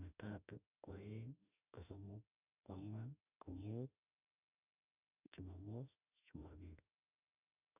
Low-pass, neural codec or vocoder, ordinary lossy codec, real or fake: 3.6 kHz; autoencoder, 48 kHz, 32 numbers a frame, DAC-VAE, trained on Japanese speech; none; fake